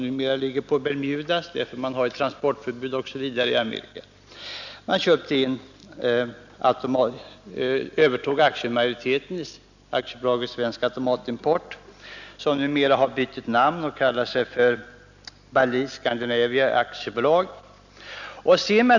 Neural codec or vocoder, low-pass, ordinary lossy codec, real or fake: none; 7.2 kHz; none; real